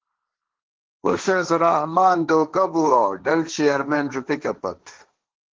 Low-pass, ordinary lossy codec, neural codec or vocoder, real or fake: 7.2 kHz; Opus, 16 kbps; codec, 16 kHz, 1.1 kbps, Voila-Tokenizer; fake